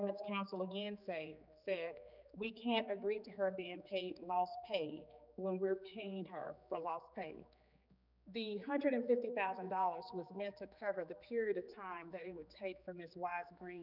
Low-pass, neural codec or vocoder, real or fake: 5.4 kHz; codec, 16 kHz, 4 kbps, X-Codec, HuBERT features, trained on general audio; fake